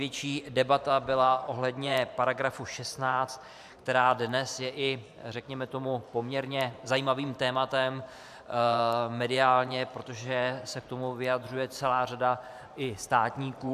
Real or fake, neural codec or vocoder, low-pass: fake; vocoder, 44.1 kHz, 128 mel bands every 512 samples, BigVGAN v2; 14.4 kHz